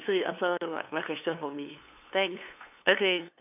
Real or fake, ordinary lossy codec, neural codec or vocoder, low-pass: fake; none; codec, 16 kHz, 2 kbps, FunCodec, trained on LibriTTS, 25 frames a second; 3.6 kHz